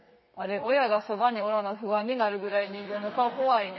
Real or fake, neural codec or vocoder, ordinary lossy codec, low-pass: fake; codec, 32 kHz, 1.9 kbps, SNAC; MP3, 24 kbps; 7.2 kHz